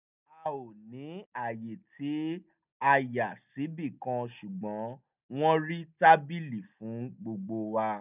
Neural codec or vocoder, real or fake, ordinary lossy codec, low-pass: none; real; none; 3.6 kHz